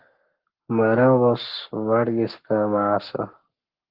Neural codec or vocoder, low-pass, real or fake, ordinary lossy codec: codec, 44.1 kHz, 7.8 kbps, Pupu-Codec; 5.4 kHz; fake; Opus, 16 kbps